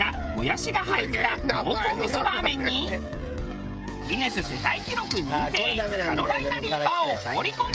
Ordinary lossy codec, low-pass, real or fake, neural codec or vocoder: none; none; fake; codec, 16 kHz, 16 kbps, FreqCodec, smaller model